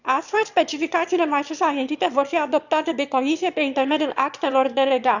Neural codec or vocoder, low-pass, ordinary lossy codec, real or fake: autoencoder, 22.05 kHz, a latent of 192 numbers a frame, VITS, trained on one speaker; 7.2 kHz; none; fake